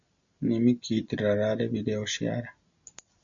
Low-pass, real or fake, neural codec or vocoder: 7.2 kHz; real; none